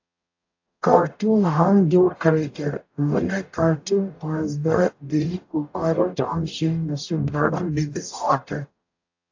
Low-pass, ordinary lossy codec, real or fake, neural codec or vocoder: 7.2 kHz; AAC, 48 kbps; fake; codec, 44.1 kHz, 0.9 kbps, DAC